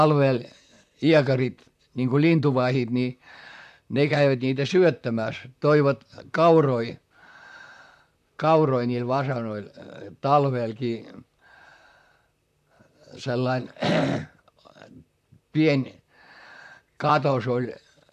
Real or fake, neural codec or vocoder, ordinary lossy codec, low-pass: fake; vocoder, 44.1 kHz, 128 mel bands, Pupu-Vocoder; AAC, 96 kbps; 14.4 kHz